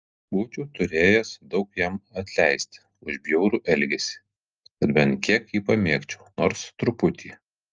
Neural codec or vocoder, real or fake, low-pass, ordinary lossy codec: none; real; 7.2 kHz; Opus, 24 kbps